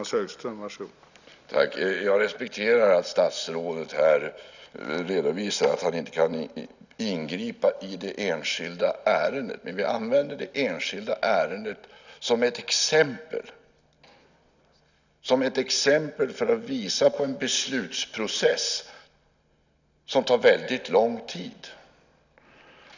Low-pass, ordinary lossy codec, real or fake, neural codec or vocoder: 7.2 kHz; none; real; none